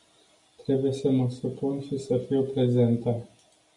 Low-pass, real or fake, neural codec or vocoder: 10.8 kHz; real; none